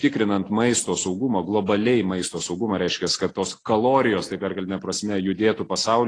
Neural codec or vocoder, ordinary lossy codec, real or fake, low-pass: none; AAC, 32 kbps; real; 9.9 kHz